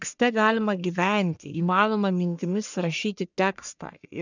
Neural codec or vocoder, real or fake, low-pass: codec, 44.1 kHz, 1.7 kbps, Pupu-Codec; fake; 7.2 kHz